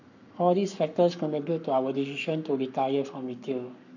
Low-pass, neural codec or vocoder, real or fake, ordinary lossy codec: 7.2 kHz; codec, 44.1 kHz, 7.8 kbps, Pupu-Codec; fake; none